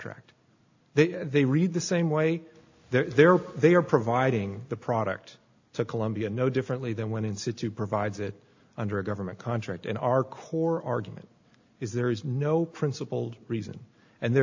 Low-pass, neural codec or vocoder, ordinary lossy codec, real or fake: 7.2 kHz; none; AAC, 48 kbps; real